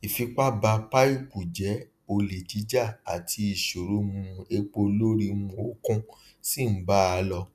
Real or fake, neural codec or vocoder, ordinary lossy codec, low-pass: real; none; none; 14.4 kHz